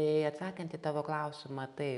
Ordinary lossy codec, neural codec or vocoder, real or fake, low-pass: MP3, 96 kbps; none; real; 10.8 kHz